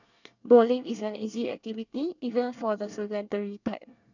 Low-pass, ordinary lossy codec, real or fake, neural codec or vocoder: 7.2 kHz; none; fake; codec, 24 kHz, 1 kbps, SNAC